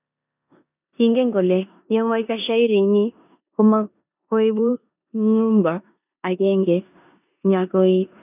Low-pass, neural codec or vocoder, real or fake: 3.6 kHz; codec, 16 kHz in and 24 kHz out, 0.9 kbps, LongCat-Audio-Codec, four codebook decoder; fake